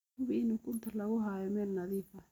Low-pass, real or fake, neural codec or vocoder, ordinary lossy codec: 19.8 kHz; real; none; none